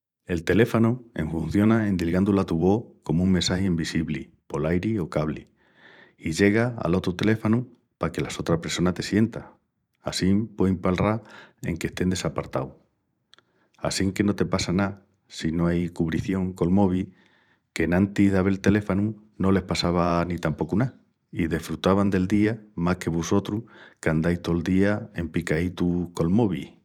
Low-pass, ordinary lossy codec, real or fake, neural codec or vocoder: 19.8 kHz; none; fake; vocoder, 44.1 kHz, 128 mel bands every 512 samples, BigVGAN v2